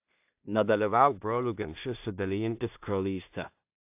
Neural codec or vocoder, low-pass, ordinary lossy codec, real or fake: codec, 16 kHz in and 24 kHz out, 0.4 kbps, LongCat-Audio-Codec, two codebook decoder; 3.6 kHz; AAC, 32 kbps; fake